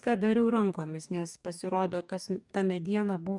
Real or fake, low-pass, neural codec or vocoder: fake; 10.8 kHz; codec, 44.1 kHz, 2.6 kbps, DAC